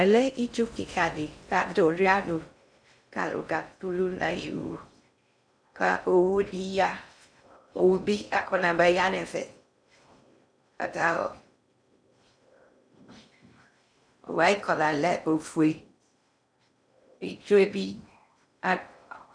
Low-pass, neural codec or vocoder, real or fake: 9.9 kHz; codec, 16 kHz in and 24 kHz out, 0.6 kbps, FocalCodec, streaming, 2048 codes; fake